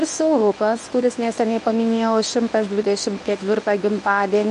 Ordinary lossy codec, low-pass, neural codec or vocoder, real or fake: AAC, 96 kbps; 10.8 kHz; codec, 24 kHz, 0.9 kbps, WavTokenizer, medium speech release version 1; fake